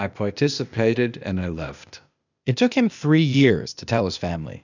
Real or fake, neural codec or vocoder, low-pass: fake; codec, 16 kHz, 0.8 kbps, ZipCodec; 7.2 kHz